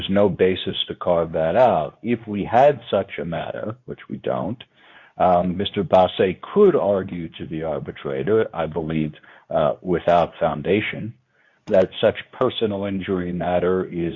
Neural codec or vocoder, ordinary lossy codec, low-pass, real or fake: codec, 24 kHz, 0.9 kbps, WavTokenizer, medium speech release version 2; MP3, 48 kbps; 7.2 kHz; fake